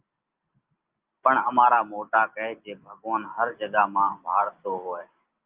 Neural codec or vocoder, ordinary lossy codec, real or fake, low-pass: none; Opus, 32 kbps; real; 3.6 kHz